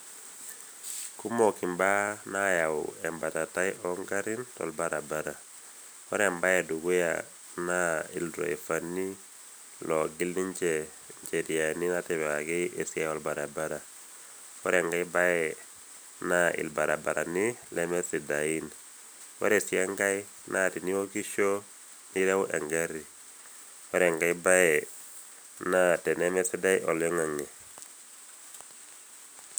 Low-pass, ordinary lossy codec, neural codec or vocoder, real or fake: none; none; none; real